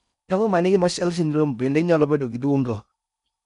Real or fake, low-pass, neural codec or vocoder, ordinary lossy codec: fake; 10.8 kHz; codec, 16 kHz in and 24 kHz out, 0.6 kbps, FocalCodec, streaming, 4096 codes; none